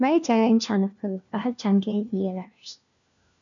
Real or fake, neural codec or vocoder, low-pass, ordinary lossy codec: fake; codec, 16 kHz, 1 kbps, FunCodec, trained on LibriTTS, 50 frames a second; 7.2 kHz; MP3, 96 kbps